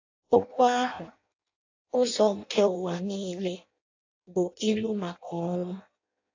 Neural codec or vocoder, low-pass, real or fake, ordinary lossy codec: codec, 16 kHz in and 24 kHz out, 0.6 kbps, FireRedTTS-2 codec; 7.2 kHz; fake; AAC, 48 kbps